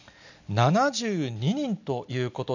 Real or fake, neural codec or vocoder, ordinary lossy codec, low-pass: real; none; none; 7.2 kHz